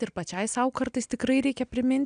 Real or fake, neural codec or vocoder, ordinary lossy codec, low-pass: real; none; AAC, 96 kbps; 9.9 kHz